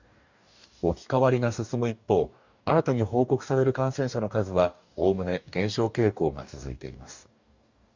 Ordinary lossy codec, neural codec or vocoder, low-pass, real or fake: Opus, 64 kbps; codec, 44.1 kHz, 2.6 kbps, DAC; 7.2 kHz; fake